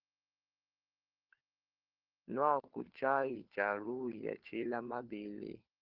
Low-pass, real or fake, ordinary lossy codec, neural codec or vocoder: 5.4 kHz; fake; Opus, 16 kbps; codec, 16 kHz, 4 kbps, FunCodec, trained on LibriTTS, 50 frames a second